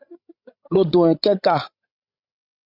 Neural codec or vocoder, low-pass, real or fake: codec, 16 kHz, 16 kbps, FreqCodec, larger model; 5.4 kHz; fake